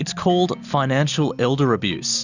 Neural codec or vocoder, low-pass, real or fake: none; 7.2 kHz; real